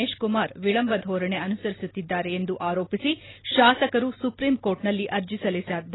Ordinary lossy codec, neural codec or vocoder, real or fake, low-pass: AAC, 16 kbps; none; real; 7.2 kHz